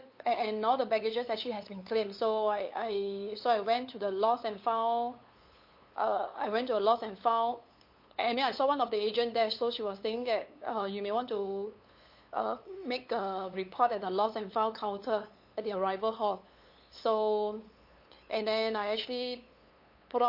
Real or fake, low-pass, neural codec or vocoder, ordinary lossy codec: fake; 5.4 kHz; codec, 16 kHz, 8 kbps, FunCodec, trained on LibriTTS, 25 frames a second; MP3, 32 kbps